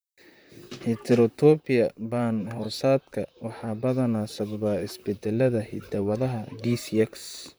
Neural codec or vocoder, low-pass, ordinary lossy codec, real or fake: vocoder, 44.1 kHz, 128 mel bands, Pupu-Vocoder; none; none; fake